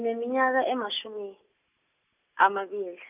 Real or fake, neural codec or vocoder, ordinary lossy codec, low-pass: real; none; none; 3.6 kHz